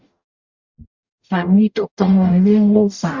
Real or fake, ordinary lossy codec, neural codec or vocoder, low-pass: fake; Opus, 64 kbps; codec, 44.1 kHz, 0.9 kbps, DAC; 7.2 kHz